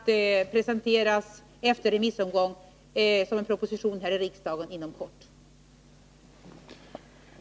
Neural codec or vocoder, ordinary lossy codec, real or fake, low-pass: none; none; real; none